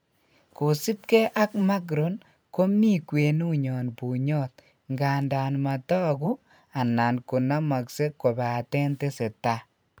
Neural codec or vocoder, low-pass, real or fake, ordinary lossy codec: vocoder, 44.1 kHz, 128 mel bands every 512 samples, BigVGAN v2; none; fake; none